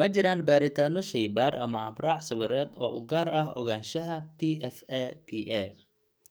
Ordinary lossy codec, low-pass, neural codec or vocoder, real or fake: none; none; codec, 44.1 kHz, 2.6 kbps, SNAC; fake